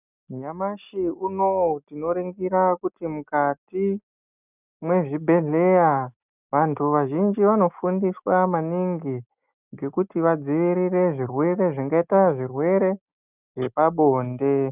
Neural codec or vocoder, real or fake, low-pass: none; real; 3.6 kHz